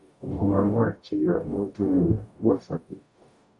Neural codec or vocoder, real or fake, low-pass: codec, 44.1 kHz, 0.9 kbps, DAC; fake; 10.8 kHz